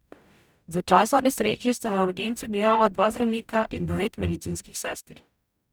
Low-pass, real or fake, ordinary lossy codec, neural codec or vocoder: none; fake; none; codec, 44.1 kHz, 0.9 kbps, DAC